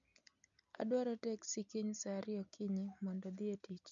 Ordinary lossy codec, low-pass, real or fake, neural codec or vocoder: none; 7.2 kHz; real; none